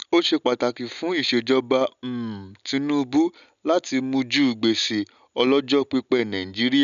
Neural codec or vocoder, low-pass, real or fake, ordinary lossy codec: none; 7.2 kHz; real; none